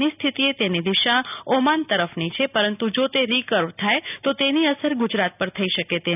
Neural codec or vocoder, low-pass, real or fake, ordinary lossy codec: none; 3.6 kHz; real; none